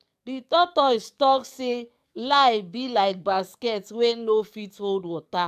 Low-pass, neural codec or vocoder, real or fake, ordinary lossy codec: 14.4 kHz; codec, 44.1 kHz, 7.8 kbps, DAC; fake; AAC, 96 kbps